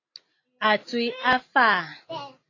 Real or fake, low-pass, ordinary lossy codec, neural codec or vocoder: real; 7.2 kHz; AAC, 32 kbps; none